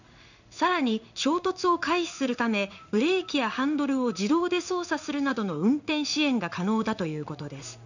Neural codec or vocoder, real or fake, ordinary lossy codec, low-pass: codec, 16 kHz in and 24 kHz out, 1 kbps, XY-Tokenizer; fake; none; 7.2 kHz